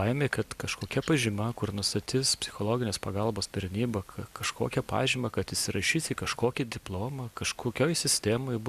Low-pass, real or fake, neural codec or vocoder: 14.4 kHz; real; none